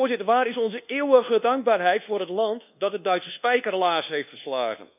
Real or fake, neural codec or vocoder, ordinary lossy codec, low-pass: fake; codec, 24 kHz, 1.2 kbps, DualCodec; none; 3.6 kHz